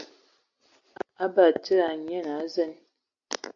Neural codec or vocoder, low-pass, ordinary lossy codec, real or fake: none; 7.2 kHz; AAC, 64 kbps; real